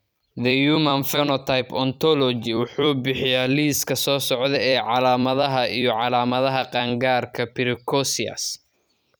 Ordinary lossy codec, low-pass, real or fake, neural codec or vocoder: none; none; fake; vocoder, 44.1 kHz, 128 mel bands every 256 samples, BigVGAN v2